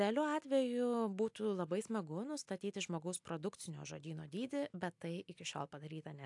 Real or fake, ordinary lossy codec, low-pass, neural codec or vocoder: real; AAC, 64 kbps; 10.8 kHz; none